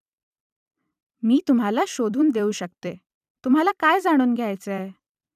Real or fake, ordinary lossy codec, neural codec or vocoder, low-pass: fake; none; vocoder, 44.1 kHz, 128 mel bands every 256 samples, BigVGAN v2; 14.4 kHz